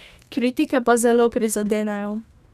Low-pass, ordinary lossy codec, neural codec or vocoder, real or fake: 14.4 kHz; none; codec, 32 kHz, 1.9 kbps, SNAC; fake